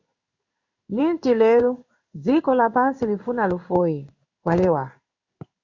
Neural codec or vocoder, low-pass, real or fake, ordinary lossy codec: codec, 16 kHz in and 24 kHz out, 1 kbps, XY-Tokenizer; 7.2 kHz; fake; Opus, 64 kbps